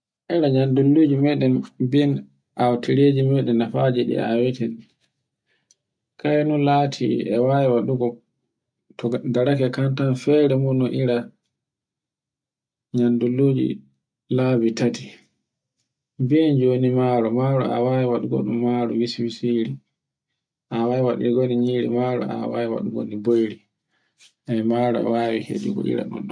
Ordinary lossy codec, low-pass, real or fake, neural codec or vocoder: MP3, 96 kbps; 9.9 kHz; real; none